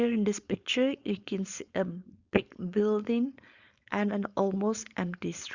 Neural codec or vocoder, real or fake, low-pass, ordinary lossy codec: codec, 16 kHz, 4.8 kbps, FACodec; fake; 7.2 kHz; Opus, 64 kbps